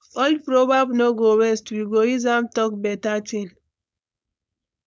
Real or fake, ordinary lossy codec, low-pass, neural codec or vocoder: fake; none; none; codec, 16 kHz, 4.8 kbps, FACodec